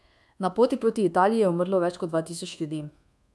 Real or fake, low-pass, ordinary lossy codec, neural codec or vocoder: fake; none; none; codec, 24 kHz, 1.2 kbps, DualCodec